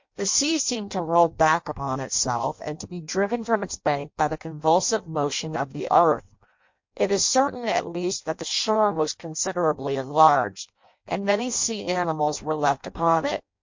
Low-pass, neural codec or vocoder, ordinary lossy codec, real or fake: 7.2 kHz; codec, 16 kHz in and 24 kHz out, 0.6 kbps, FireRedTTS-2 codec; MP3, 48 kbps; fake